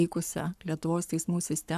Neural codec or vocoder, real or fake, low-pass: codec, 44.1 kHz, 7.8 kbps, Pupu-Codec; fake; 14.4 kHz